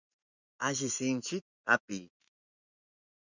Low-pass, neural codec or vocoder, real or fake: 7.2 kHz; vocoder, 24 kHz, 100 mel bands, Vocos; fake